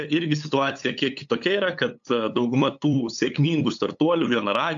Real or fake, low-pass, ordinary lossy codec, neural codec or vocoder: fake; 7.2 kHz; AAC, 64 kbps; codec, 16 kHz, 8 kbps, FunCodec, trained on LibriTTS, 25 frames a second